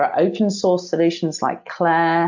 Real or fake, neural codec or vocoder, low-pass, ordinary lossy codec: real; none; 7.2 kHz; MP3, 64 kbps